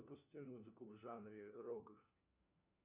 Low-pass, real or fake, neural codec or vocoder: 3.6 kHz; fake; codec, 16 kHz, 1 kbps, FunCodec, trained on LibriTTS, 50 frames a second